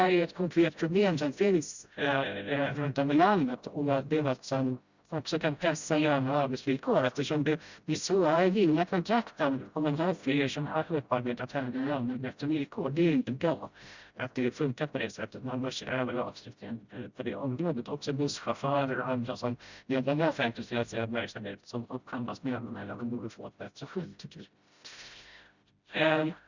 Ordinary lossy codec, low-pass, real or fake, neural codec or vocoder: Opus, 64 kbps; 7.2 kHz; fake; codec, 16 kHz, 0.5 kbps, FreqCodec, smaller model